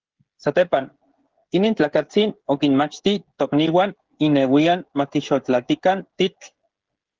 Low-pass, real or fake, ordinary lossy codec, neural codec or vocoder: 7.2 kHz; fake; Opus, 16 kbps; codec, 16 kHz, 16 kbps, FreqCodec, smaller model